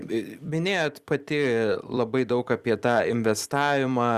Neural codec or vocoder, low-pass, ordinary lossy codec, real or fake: vocoder, 44.1 kHz, 128 mel bands every 256 samples, BigVGAN v2; 14.4 kHz; Opus, 64 kbps; fake